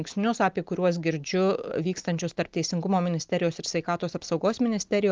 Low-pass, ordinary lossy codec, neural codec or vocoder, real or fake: 7.2 kHz; Opus, 24 kbps; none; real